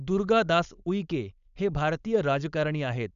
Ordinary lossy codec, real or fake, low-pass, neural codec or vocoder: none; fake; 7.2 kHz; codec, 16 kHz, 4.8 kbps, FACodec